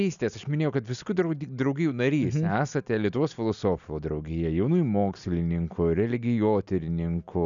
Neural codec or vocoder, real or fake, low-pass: none; real; 7.2 kHz